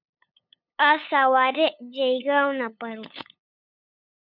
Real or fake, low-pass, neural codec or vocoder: fake; 5.4 kHz; codec, 16 kHz, 8 kbps, FunCodec, trained on LibriTTS, 25 frames a second